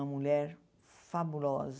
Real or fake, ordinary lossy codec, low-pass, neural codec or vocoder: real; none; none; none